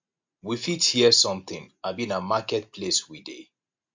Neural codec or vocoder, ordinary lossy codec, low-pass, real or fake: none; MP3, 48 kbps; 7.2 kHz; real